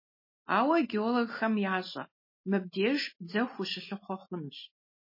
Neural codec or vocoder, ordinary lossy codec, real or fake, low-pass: none; MP3, 24 kbps; real; 5.4 kHz